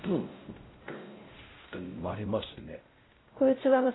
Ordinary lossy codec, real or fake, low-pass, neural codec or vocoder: AAC, 16 kbps; fake; 7.2 kHz; codec, 16 kHz, 0.5 kbps, X-Codec, WavLM features, trained on Multilingual LibriSpeech